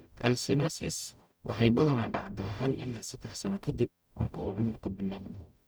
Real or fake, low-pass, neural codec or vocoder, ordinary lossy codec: fake; none; codec, 44.1 kHz, 0.9 kbps, DAC; none